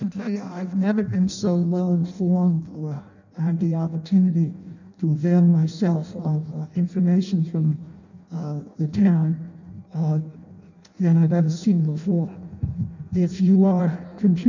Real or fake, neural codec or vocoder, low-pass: fake; codec, 16 kHz in and 24 kHz out, 0.6 kbps, FireRedTTS-2 codec; 7.2 kHz